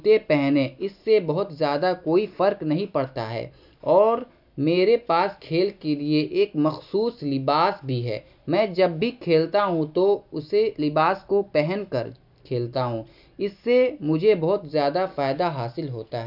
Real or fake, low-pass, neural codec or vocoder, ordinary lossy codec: real; 5.4 kHz; none; none